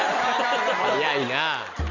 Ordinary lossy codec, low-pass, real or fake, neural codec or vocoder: Opus, 64 kbps; 7.2 kHz; real; none